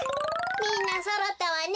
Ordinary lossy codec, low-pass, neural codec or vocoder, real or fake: none; none; none; real